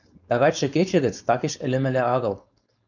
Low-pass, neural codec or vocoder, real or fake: 7.2 kHz; codec, 16 kHz, 4.8 kbps, FACodec; fake